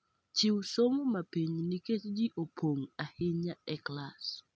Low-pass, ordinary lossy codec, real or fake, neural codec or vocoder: none; none; real; none